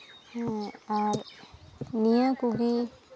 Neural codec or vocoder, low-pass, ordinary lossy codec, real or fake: none; none; none; real